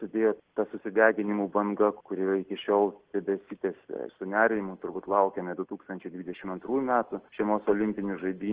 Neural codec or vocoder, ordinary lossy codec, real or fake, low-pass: none; Opus, 16 kbps; real; 3.6 kHz